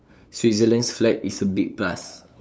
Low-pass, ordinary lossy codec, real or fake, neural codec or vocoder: none; none; fake; codec, 16 kHz, 8 kbps, FunCodec, trained on LibriTTS, 25 frames a second